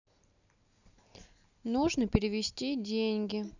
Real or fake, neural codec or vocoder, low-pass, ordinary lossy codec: real; none; 7.2 kHz; none